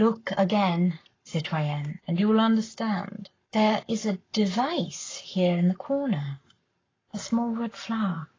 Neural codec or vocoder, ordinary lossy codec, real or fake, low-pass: codec, 44.1 kHz, 7.8 kbps, Pupu-Codec; AAC, 32 kbps; fake; 7.2 kHz